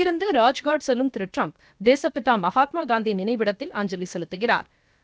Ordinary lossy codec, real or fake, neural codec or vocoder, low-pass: none; fake; codec, 16 kHz, about 1 kbps, DyCAST, with the encoder's durations; none